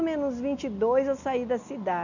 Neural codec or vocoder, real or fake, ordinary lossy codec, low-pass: none; real; none; 7.2 kHz